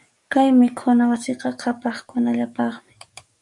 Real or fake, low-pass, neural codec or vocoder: fake; 10.8 kHz; codec, 44.1 kHz, 7.8 kbps, DAC